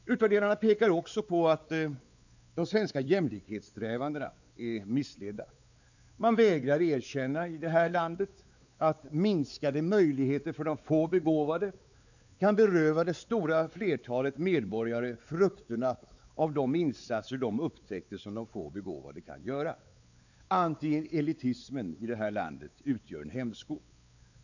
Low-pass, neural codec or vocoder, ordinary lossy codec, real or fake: 7.2 kHz; codec, 16 kHz, 4 kbps, X-Codec, WavLM features, trained on Multilingual LibriSpeech; none; fake